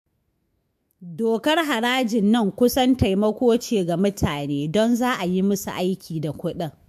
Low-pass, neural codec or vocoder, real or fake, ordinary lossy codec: 14.4 kHz; autoencoder, 48 kHz, 128 numbers a frame, DAC-VAE, trained on Japanese speech; fake; MP3, 64 kbps